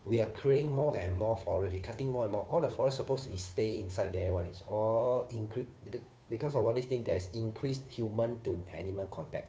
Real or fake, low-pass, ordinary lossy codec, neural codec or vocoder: fake; none; none; codec, 16 kHz, 2 kbps, FunCodec, trained on Chinese and English, 25 frames a second